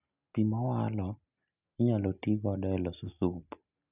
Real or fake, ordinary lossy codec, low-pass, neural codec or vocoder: real; none; 3.6 kHz; none